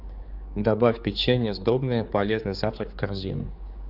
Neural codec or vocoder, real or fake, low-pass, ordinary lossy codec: codec, 16 kHz, 4 kbps, X-Codec, HuBERT features, trained on general audio; fake; 5.4 kHz; Opus, 64 kbps